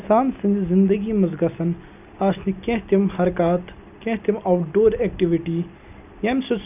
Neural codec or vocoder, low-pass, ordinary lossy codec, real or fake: none; 3.6 kHz; none; real